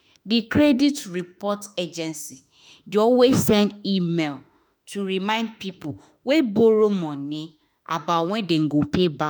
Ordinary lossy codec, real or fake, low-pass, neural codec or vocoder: none; fake; none; autoencoder, 48 kHz, 32 numbers a frame, DAC-VAE, trained on Japanese speech